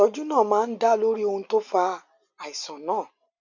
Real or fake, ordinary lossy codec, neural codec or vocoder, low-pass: real; none; none; 7.2 kHz